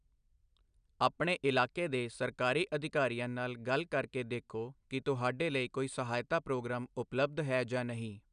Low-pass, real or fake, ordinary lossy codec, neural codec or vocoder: 9.9 kHz; real; none; none